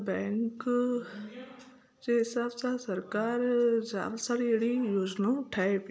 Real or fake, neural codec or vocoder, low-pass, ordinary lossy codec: real; none; none; none